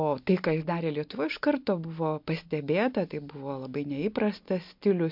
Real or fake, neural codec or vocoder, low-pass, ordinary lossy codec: real; none; 5.4 kHz; MP3, 48 kbps